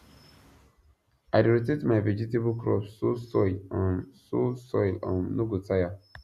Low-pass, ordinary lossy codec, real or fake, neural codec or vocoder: 14.4 kHz; none; real; none